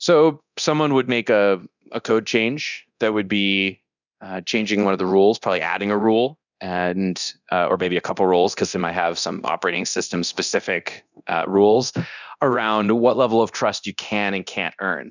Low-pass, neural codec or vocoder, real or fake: 7.2 kHz; codec, 24 kHz, 0.9 kbps, DualCodec; fake